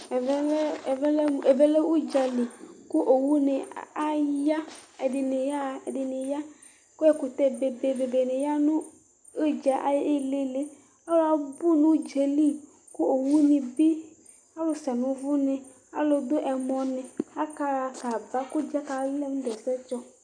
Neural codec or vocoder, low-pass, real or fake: none; 9.9 kHz; real